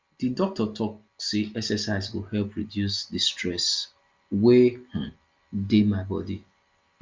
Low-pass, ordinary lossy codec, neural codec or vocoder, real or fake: 7.2 kHz; Opus, 32 kbps; none; real